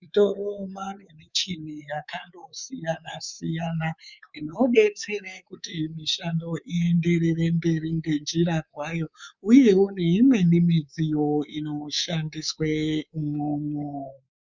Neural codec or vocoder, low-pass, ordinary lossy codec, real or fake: codec, 24 kHz, 3.1 kbps, DualCodec; 7.2 kHz; Opus, 64 kbps; fake